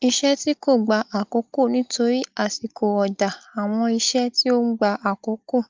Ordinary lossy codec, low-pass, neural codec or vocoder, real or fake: Opus, 24 kbps; 7.2 kHz; none; real